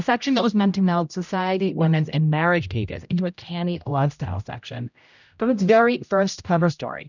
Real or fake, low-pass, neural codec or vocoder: fake; 7.2 kHz; codec, 16 kHz, 0.5 kbps, X-Codec, HuBERT features, trained on general audio